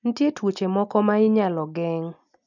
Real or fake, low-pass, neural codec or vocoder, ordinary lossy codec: real; 7.2 kHz; none; none